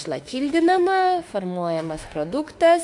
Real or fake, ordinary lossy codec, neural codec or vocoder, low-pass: fake; AAC, 64 kbps; autoencoder, 48 kHz, 32 numbers a frame, DAC-VAE, trained on Japanese speech; 10.8 kHz